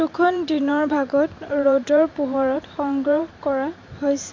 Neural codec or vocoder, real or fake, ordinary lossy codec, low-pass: vocoder, 44.1 kHz, 128 mel bands every 512 samples, BigVGAN v2; fake; MP3, 48 kbps; 7.2 kHz